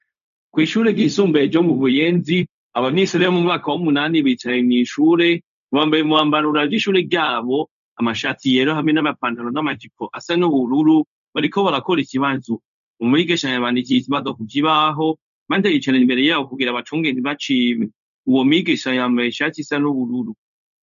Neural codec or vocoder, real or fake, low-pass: codec, 16 kHz, 0.4 kbps, LongCat-Audio-Codec; fake; 7.2 kHz